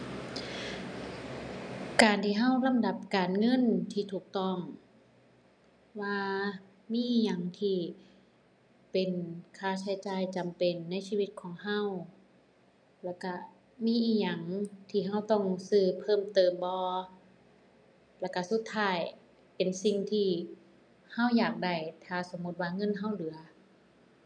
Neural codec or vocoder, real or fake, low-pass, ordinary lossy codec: none; real; 9.9 kHz; none